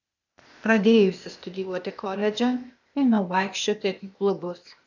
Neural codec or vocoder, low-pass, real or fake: codec, 16 kHz, 0.8 kbps, ZipCodec; 7.2 kHz; fake